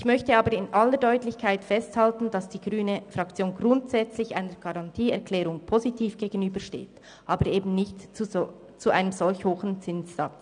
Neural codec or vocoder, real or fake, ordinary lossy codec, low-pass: none; real; none; 9.9 kHz